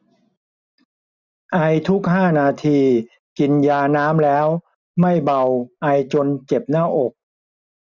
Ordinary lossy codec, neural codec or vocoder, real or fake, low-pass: none; none; real; 7.2 kHz